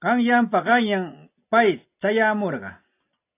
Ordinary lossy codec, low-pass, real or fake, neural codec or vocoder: AAC, 24 kbps; 3.6 kHz; real; none